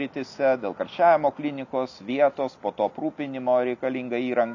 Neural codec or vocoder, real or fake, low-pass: none; real; 7.2 kHz